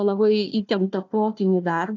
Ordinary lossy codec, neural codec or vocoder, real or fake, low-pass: AAC, 48 kbps; codec, 16 kHz, 1 kbps, FunCodec, trained on Chinese and English, 50 frames a second; fake; 7.2 kHz